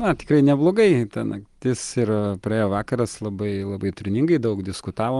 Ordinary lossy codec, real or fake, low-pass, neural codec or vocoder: Opus, 24 kbps; real; 10.8 kHz; none